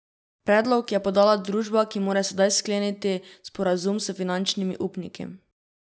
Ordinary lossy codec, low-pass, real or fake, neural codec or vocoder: none; none; real; none